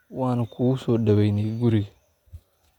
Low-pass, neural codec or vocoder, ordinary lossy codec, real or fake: 19.8 kHz; none; none; real